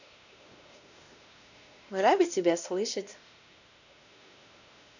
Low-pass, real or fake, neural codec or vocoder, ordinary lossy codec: 7.2 kHz; fake; codec, 16 kHz, 1 kbps, X-Codec, WavLM features, trained on Multilingual LibriSpeech; none